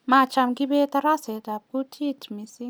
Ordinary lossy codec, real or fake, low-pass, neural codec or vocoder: none; real; none; none